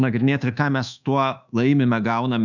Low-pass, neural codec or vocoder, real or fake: 7.2 kHz; codec, 24 kHz, 1.2 kbps, DualCodec; fake